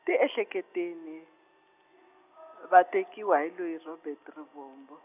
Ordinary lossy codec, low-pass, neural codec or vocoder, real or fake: none; 3.6 kHz; none; real